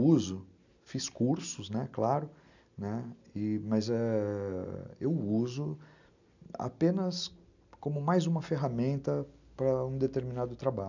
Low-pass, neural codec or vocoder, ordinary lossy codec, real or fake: 7.2 kHz; none; none; real